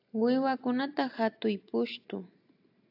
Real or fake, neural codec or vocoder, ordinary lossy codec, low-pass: real; none; AAC, 48 kbps; 5.4 kHz